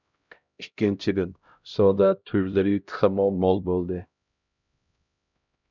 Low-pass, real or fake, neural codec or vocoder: 7.2 kHz; fake; codec, 16 kHz, 0.5 kbps, X-Codec, HuBERT features, trained on LibriSpeech